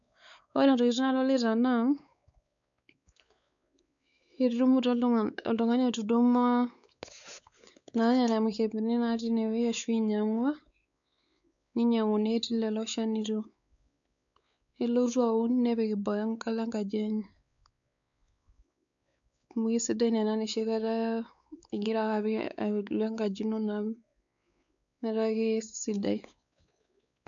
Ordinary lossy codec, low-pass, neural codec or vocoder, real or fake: none; 7.2 kHz; codec, 16 kHz, 4 kbps, X-Codec, WavLM features, trained on Multilingual LibriSpeech; fake